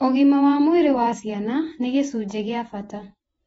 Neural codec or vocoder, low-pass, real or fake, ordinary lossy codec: vocoder, 48 kHz, 128 mel bands, Vocos; 19.8 kHz; fake; AAC, 24 kbps